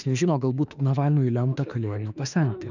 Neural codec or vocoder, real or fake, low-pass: autoencoder, 48 kHz, 32 numbers a frame, DAC-VAE, trained on Japanese speech; fake; 7.2 kHz